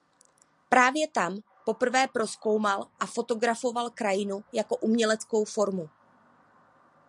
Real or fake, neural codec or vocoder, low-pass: real; none; 10.8 kHz